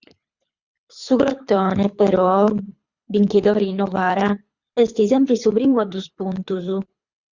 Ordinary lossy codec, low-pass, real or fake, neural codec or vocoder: Opus, 64 kbps; 7.2 kHz; fake; codec, 24 kHz, 3 kbps, HILCodec